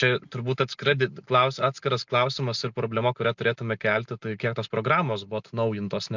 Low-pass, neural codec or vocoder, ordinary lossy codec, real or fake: 7.2 kHz; none; MP3, 64 kbps; real